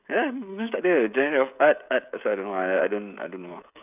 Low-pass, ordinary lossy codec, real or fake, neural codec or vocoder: 3.6 kHz; none; fake; codec, 16 kHz, 16 kbps, FreqCodec, smaller model